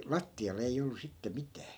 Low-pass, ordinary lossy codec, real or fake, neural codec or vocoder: none; none; real; none